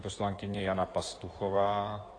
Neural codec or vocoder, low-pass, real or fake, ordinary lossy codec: codec, 16 kHz in and 24 kHz out, 2.2 kbps, FireRedTTS-2 codec; 9.9 kHz; fake; AAC, 32 kbps